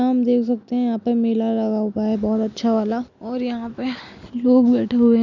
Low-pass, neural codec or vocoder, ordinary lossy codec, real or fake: 7.2 kHz; none; none; real